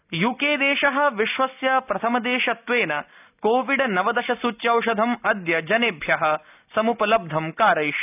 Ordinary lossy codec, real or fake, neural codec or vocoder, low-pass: none; real; none; 3.6 kHz